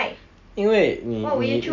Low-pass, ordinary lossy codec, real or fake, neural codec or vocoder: 7.2 kHz; none; real; none